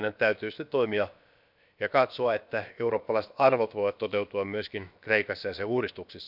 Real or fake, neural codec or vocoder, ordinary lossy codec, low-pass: fake; codec, 16 kHz, about 1 kbps, DyCAST, with the encoder's durations; none; 5.4 kHz